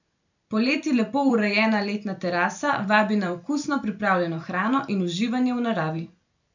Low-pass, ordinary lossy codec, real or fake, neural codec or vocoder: 7.2 kHz; none; fake; vocoder, 44.1 kHz, 128 mel bands every 256 samples, BigVGAN v2